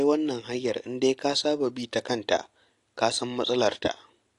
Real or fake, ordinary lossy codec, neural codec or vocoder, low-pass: real; AAC, 48 kbps; none; 10.8 kHz